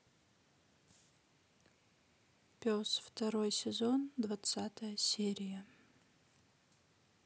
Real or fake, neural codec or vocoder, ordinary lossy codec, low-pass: real; none; none; none